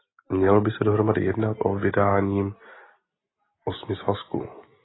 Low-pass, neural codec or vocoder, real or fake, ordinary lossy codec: 7.2 kHz; none; real; AAC, 16 kbps